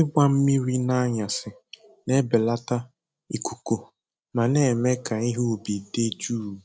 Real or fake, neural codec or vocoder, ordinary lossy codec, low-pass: real; none; none; none